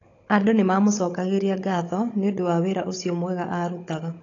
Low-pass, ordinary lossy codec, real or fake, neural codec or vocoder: 7.2 kHz; AAC, 32 kbps; fake; codec, 16 kHz, 16 kbps, FunCodec, trained on Chinese and English, 50 frames a second